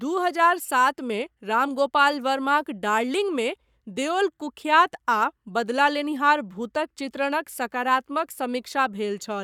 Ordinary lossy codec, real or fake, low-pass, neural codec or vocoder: none; fake; 19.8 kHz; codec, 44.1 kHz, 7.8 kbps, Pupu-Codec